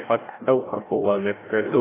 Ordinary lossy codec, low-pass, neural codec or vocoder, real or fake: AAC, 16 kbps; 3.6 kHz; codec, 16 kHz, 0.5 kbps, FreqCodec, larger model; fake